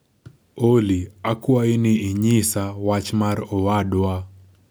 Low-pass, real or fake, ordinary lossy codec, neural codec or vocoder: none; real; none; none